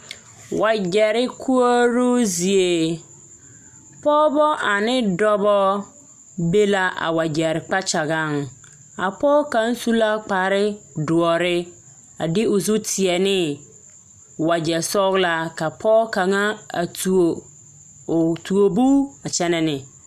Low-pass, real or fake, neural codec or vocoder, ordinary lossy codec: 14.4 kHz; real; none; MP3, 96 kbps